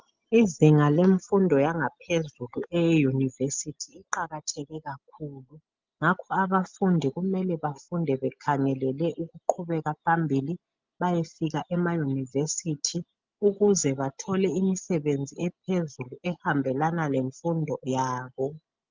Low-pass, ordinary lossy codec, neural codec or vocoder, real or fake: 7.2 kHz; Opus, 24 kbps; none; real